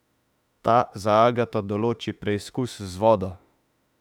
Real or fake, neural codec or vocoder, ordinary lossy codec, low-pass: fake; autoencoder, 48 kHz, 32 numbers a frame, DAC-VAE, trained on Japanese speech; none; 19.8 kHz